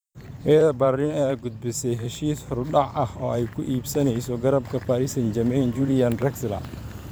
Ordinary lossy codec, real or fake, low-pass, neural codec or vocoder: none; fake; none; vocoder, 44.1 kHz, 128 mel bands every 512 samples, BigVGAN v2